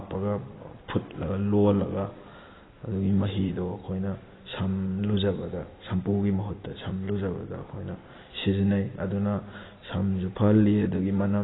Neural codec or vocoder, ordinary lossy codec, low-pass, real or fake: vocoder, 44.1 kHz, 128 mel bands every 256 samples, BigVGAN v2; AAC, 16 kbps; 7.2 kHz; fake